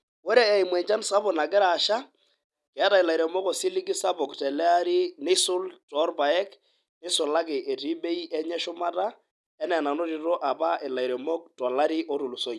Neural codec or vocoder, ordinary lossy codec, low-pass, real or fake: none; none; none; real